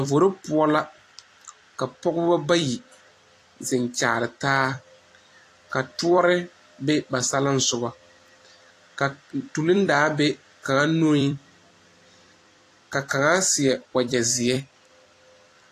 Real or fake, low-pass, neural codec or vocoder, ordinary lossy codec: fake; 14.4 kHz; vocoder, 44.1 kHz, 128 mel bands every 256 samples, BigVGAN v2; AAC, 48 kbps